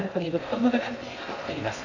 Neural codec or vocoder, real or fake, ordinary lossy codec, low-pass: codec, 16 kHz in and 24 kHz out, 0.6 kbps, FocalCodec, streaming, 2048 codes; fake; none; 7.2 kHz